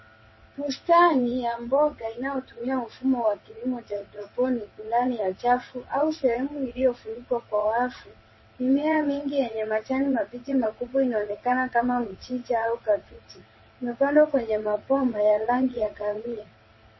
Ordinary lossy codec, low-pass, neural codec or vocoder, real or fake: MP3, 24 kbps; 7.2 kHz; vocoder, 22.05 kHz, 80 mel bands, WaveNeXt; fake